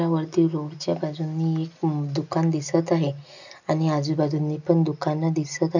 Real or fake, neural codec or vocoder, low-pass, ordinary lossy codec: real; none; 7.2 kHz; none